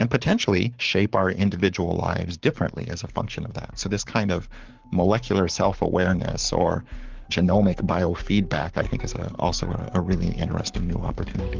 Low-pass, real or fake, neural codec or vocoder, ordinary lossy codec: 7.2 kHz; fake; codec, 44.1 kHz, 7.8 kbps, Pupu-Codec; Opus, 24 kbps